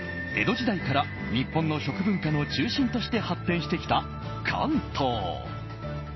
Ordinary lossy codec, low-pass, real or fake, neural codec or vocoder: MP3, 24 kbps; 7.2 kHz; real; none